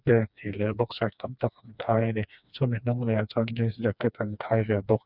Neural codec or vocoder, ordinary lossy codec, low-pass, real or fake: codec, 16 kHz, 2 kbps, FreqCodec, smaller model; none; 5.4 kHz; fake